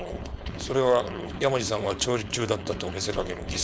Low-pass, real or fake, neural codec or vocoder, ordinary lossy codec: none; fake; codec, 16 kHz, 4.8 kbps, FACodec; none